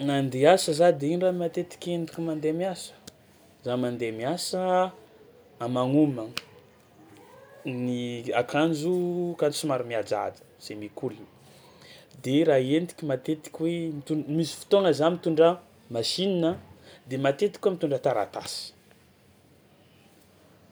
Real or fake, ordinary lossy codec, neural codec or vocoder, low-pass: real; none; none; none